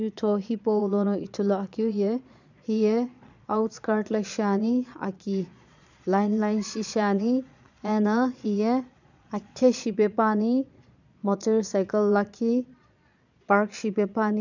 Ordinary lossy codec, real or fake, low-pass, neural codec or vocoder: none; fake; 7.2 kHz; vocoder, 22.05 kHz, 80 mel bands, Vocos